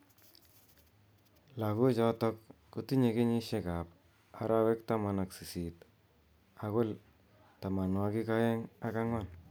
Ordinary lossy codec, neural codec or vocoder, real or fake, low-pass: none; none; real; none